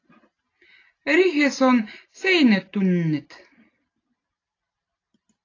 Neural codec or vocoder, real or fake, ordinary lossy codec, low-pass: none; real; AAC, 32 kbps; 7.2 kHz